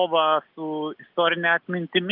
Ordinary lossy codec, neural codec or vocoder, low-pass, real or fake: Opus, 32 kbps; none; 5.4 kHz; real